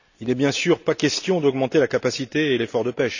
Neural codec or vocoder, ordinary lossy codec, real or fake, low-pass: none; none; real; 7.2 kHz